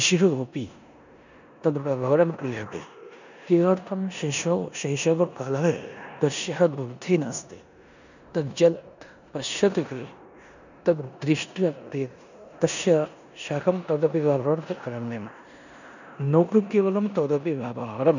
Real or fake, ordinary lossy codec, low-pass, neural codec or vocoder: fake; none; 7.2 kHz; codec, 16 kHz in and 24 kHz out, 0.9 kbps, LongCat-Audio-Codec, four codebook decoder